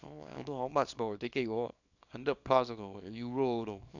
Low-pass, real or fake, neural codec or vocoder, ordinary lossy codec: 7.2 kHz; fake; codec, 24 kHz, 0.9 kbps, WavTokenizer, small release; none